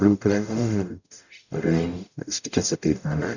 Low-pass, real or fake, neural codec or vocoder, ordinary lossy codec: 7.2 kHz; fake; codec, 44.1 kHz, 0.9 kbps, DAC; none